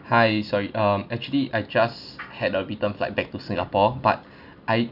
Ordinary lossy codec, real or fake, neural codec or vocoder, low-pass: none; real; none; 5.4 kHz